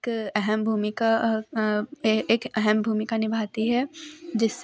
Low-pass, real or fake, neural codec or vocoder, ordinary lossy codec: none; real; none; none